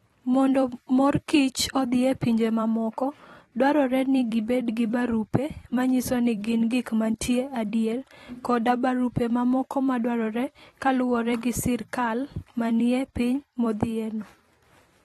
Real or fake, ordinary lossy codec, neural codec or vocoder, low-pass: fake; AAC, 32 kbps; vocoder, 44.1 kHz, 128 mel bands every 256 samples, BigVGAN v2; 19.8 kHz